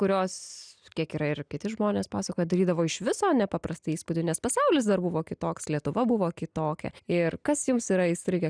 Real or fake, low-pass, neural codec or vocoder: real; 9.9 kHz; none